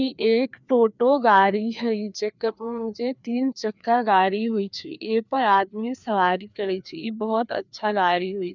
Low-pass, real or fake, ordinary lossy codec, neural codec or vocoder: 7.2 kHz; fake; none; codec, 16 kHz, 2 kbps, FreqCodec, larger model